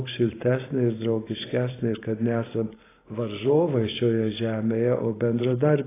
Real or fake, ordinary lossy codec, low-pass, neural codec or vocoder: fake; AAC, 16 kbps; 3.6 kHz; vocoder, 44.1 kHz, 128 mel bands every 512 samples, BigVGAN v2